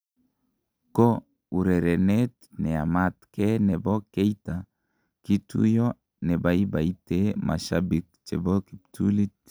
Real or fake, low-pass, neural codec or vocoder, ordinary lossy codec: real; none; none; none